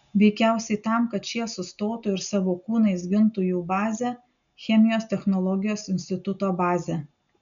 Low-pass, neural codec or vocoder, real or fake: 7.2 kHz; none; real